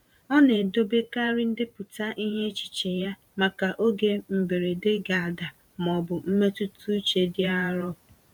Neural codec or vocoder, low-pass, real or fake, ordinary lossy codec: vocoder, 44.1 kHz, 128 mel bands every 512 samples, BigVGAN v2; 19.8 kHz; fake; none